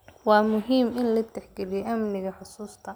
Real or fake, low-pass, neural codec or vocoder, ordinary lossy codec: real; none; none; none